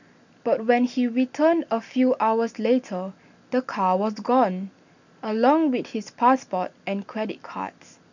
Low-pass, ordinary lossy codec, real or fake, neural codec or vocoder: 7.2 kHz; none; real; none